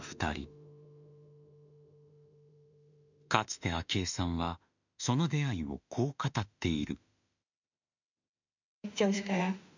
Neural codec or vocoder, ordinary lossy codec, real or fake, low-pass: autoencoder, 48 kHz, 32 numbers a frame, DAC-VAE, trained on Japanese speech; none; fake; 7.2 kHz